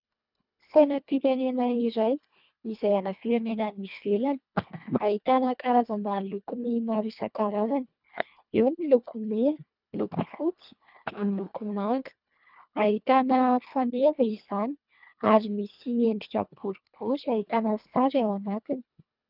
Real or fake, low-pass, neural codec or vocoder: fake; 5.4 kHz; codec, 24 kHz, 1.5 kbps, HILCodec